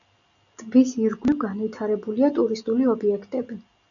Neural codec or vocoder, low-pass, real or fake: none; 7.2 kHz; real